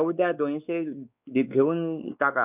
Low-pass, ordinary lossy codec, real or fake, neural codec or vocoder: 3.6 kHz; none; fake; codec, 16 kHz, 4 kbps, X-Codec, WavLM features, trained on Multilingual LibriSpeech